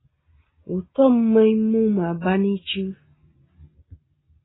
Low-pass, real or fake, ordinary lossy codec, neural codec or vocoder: 7.2 kHz; real; AAC, 16 kbps; none